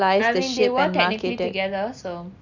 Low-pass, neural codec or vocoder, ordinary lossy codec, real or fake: 7.2 kHz; none; none; real